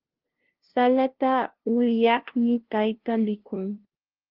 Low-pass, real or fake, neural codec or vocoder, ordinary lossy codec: 5.4 kHz; fake; codec, 16 kHz, 0.5 kbps, FunCodec, trained on LibriTTS, 25 frames a second; Opus, 16 kbps